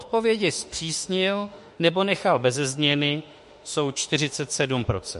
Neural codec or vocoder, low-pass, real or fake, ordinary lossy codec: autoencoder, 48 kHz, 32 numbers a frame, DAC-VAE, trained on Japanese speech; 14.4 kHz; fake; MP3, 48 kbps